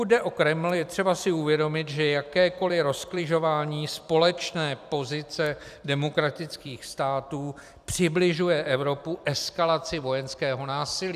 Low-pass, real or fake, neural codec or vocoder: 14.4 kHz; fake; vocoder, 44.1 kHz, 128 mel bands every 256 samples, BigVGAN v2